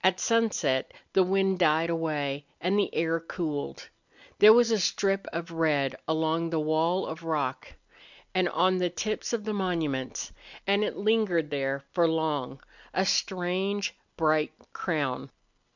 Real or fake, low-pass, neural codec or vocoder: real; 7.2 kHz; none